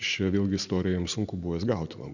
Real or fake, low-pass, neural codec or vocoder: real; 7.2 kHz; none